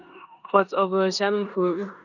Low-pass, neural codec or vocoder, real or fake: 7.2 kHz; codec, 16 kHz in and 24 kHz out, 0.9 kbps, LongCat-Audio-Codec, four codebook decoder; fake